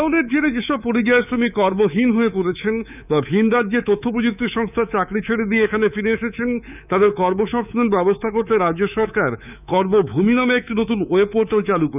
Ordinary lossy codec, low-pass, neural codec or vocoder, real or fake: none; 3.6 kHz; codec, 24 kHz, 3.1 kbps, DualCodec; fake